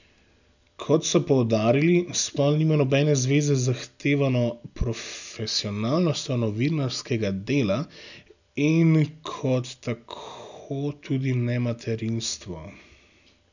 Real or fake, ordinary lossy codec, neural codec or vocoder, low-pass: real; none; none; 7.2 kHz